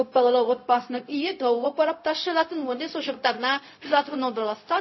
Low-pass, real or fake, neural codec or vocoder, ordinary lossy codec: 7.2 kHz; fake; codec, 16 kHz, 0.4 kbps, LongCat-Audio-Codec; MP3, 24 kbps